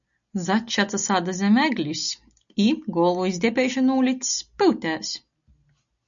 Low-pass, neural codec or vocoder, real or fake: 7.2 kHz; none; real